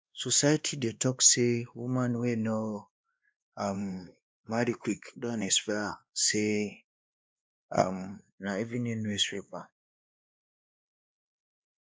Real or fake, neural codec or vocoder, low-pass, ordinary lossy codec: fake; codec, 16 kHz, 2 kbps, X-Codec, WavLM features, trained on Multilingual LibriSpeech; none; none